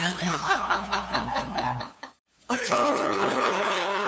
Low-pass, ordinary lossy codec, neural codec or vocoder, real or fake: none; none; codec, 16 kHz, 2 kbps, FunCodec, trained on LibriTTS, 25 frames a second; fake